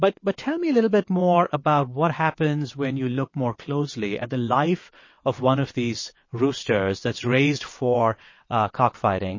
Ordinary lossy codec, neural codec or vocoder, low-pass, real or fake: MP3, 32 kbps; vocoder, 22.05 kHz, 80 mel bands, WaveNeXt; 7.2 kHz; fake